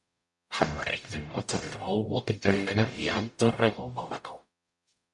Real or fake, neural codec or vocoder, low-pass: fake; codec, 44.1 kHz, 0.9 kbps, DAC; 10.8 kHz